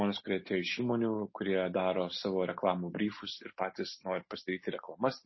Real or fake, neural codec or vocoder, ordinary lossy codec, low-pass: real; none; MP3, 24 kbps; 7.2 kHz